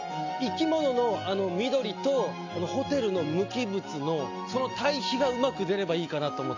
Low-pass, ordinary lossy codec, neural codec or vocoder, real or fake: 7.2 kHz; AAC, 48 kbps; none; real